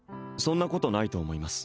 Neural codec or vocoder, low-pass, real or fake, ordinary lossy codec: none; none; real; none